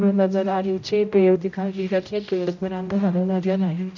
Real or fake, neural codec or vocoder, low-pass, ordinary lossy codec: fake; codec, 16 kHz, 0.5 kbps, X-Codec, HuBERT features, trained on general audio; 7.2 kHz; none